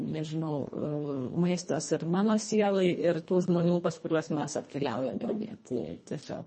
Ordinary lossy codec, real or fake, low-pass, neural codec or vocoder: MP3, 32 kbps; fake; 10.8 kHz; codec, 24 kHz, 1.5 kbps, HILCodec